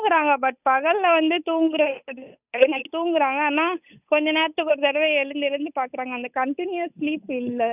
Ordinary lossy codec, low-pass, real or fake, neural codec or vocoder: none; 3.6 kHz; fake; codec, 16 kHz, 8 kbps, FunCodec, trained on Chinese and English, 25 frames a second